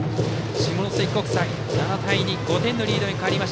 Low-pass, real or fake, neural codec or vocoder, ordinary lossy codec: none; real; none; none